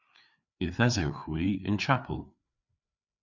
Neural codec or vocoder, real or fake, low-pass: codec, 16 kHz, 4 kbps, FreqCodec, larger model; fake; 7.2 kHz